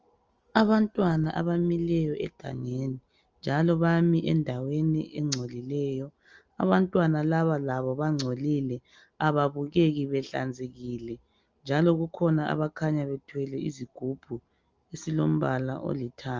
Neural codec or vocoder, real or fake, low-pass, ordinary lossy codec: none; real; 7.2 kHz; Opus, 24 kbps